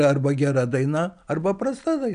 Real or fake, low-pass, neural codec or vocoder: real; 9.9 kHz; none